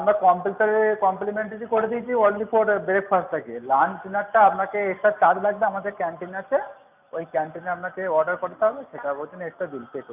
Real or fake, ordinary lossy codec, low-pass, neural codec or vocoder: real; none; 3.6 kHz; none